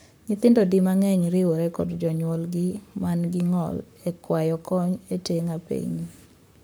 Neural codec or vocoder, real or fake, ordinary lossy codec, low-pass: codec, 44.1 kHz, 7.8 kbps, Pupu-Codec; fake; none; none